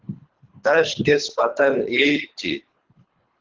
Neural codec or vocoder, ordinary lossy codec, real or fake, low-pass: codec, 24 kHz, 3 kbps, HILCodec; Opus, 16 kbps; fake; 7.2 kHz